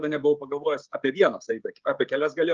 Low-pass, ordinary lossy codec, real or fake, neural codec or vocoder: 7.2 kHz; Opus, 24 kbps; fake; codec, 16 kHz, 16 kbps, FreqCodec, smaller model